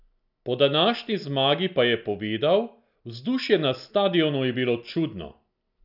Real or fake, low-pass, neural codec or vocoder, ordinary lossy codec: real; 5.4 kHz; none; none